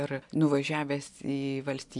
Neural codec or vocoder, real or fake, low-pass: none; real; 10.8 kHz